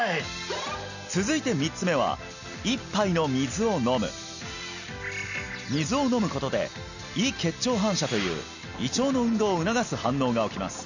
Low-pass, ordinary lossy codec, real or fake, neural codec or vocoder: 7.2 kHz; none; real; none